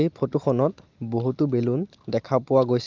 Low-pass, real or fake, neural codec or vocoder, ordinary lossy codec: 7.2 kHz; real; none; Opus, 32 kbps